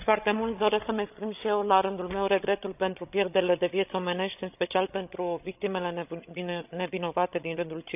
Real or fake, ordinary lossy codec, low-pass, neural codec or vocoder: fake; none; 3.6 kHz; codec, 16 kHz, 16 kbps, FreqCodec, larger model